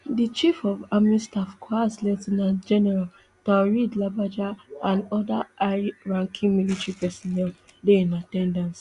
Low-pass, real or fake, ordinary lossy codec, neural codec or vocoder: 10.8 kHz; real; Opus, 64 kbps; none